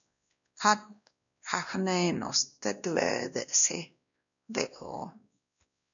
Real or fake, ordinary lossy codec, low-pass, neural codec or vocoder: fake; MP3, 96 kbps; 7.2 kHz; codec, 16 kHz, 1 kbps, X-Codec, WavLM features, trained on Multilingual LibriSpeech